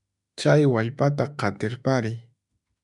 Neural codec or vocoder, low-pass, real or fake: autoencoder, 48 kHz, 32 numbers a frame, DAC-VAE, trained on Japanese speech; 10.8 kHz; fake